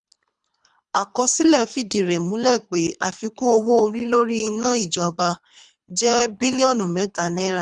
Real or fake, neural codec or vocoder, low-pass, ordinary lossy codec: fake; codec, 24 kHz, 3 kbps, HILCodec; 10.8 kHz; none